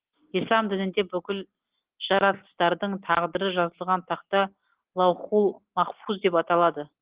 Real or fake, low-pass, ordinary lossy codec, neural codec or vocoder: real; 3.6 kHz; Opus, 16 kbps; none